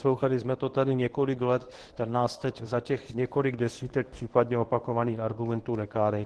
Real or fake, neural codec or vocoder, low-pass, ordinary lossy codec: fake; codec, 24 kHz, 0.9 kbps, WavTokenizer, medium speech release version 1; 10.8 kHz; Opus, 16 kbps